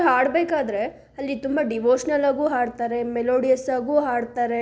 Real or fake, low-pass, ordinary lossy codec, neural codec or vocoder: real; none; none; none